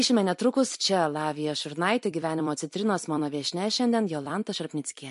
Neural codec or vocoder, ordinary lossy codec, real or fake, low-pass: vocoder, 44.1 kHz, 128 mel bands every 256 samples, BigVGAN v2; MP3, 48 kbps; fake; 14.4 kHz